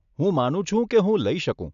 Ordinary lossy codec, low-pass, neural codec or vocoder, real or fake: none; 7.2 kHz; none; real